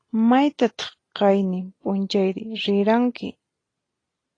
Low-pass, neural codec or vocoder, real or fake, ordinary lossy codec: 9.9 kHz; none; real; AAC, 32 kbps